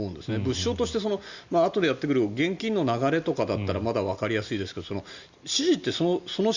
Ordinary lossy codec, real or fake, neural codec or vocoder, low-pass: Opus, 64 kbps; real; none; 7.2 kHz